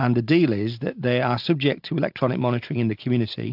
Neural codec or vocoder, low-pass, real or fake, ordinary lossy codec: none; 5.4 kHz; real; AAC, 48 kbps